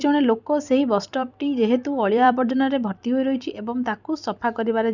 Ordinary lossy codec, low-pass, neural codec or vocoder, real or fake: none; 7.2 kHz; vocoder, 44.1 kHz, 128 mel bands every 256 samples, BigVGAN v2; fake